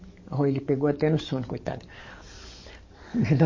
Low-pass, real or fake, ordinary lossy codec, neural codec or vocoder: 7.2 kHz; fake; MP3, 32 kbps; codec, 44.1 kHz, 7.8 kbps, DAC